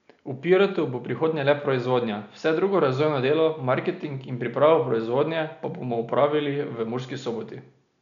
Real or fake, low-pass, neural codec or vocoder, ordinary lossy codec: real; 7.2 kHz; none; none